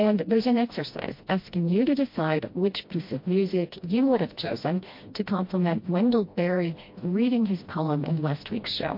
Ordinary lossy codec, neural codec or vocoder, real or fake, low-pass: MP3, 32 kbps; codec, 16 kHz, 1 kbps, FreqCodec, smaller model; fake; 5.4 kHz